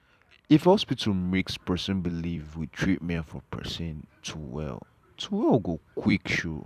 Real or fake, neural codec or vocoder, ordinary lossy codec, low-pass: fake; vocoder, 44.1 kHz, 128 mel bands every 256 samples, BigVGAN v2; none; 14.4 kHz